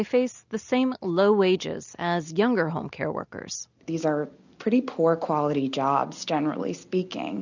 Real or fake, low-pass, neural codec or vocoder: real; 7.2 kHz; none